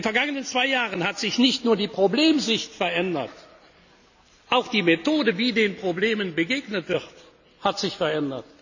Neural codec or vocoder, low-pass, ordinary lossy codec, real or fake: none; 7.2 kHz; none; real